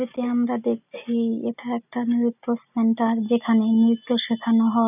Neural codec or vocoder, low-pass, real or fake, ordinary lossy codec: none; 3.6 kHz; real; none